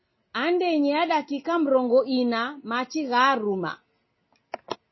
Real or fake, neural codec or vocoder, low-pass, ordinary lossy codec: real; none; 7.2 kHz; MP3, 24 kbps